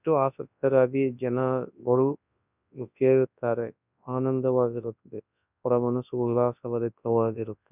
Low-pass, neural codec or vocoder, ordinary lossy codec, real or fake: 3.6 kHz; codec, 24 kHz, 0.9 kbps, WavTokenizer, large speech release; none; fake